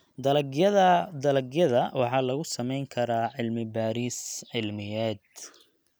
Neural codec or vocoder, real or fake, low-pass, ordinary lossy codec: none; real; none; none